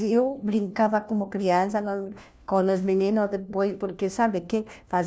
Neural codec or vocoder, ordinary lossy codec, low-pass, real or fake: codec, 16 kHz, 1 kbps, FunCodec, trained on LibriTTS, 50 frames a second; none; none; fake